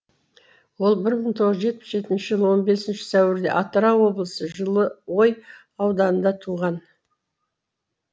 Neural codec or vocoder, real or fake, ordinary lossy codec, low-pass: none; real; none; none